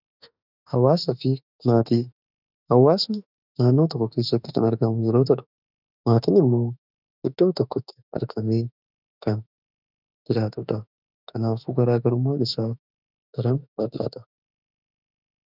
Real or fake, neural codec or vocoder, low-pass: fake; autoencoder, 48 kHz, 32 numbers a frame, DAC-VAE, trained on Japanese speech; 5.4 kHz